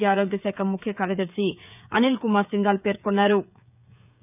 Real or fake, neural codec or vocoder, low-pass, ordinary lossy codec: fake; codec, 16 kHz, 16 kbps, FreqCodec, smaller model; 3.6 kHz; none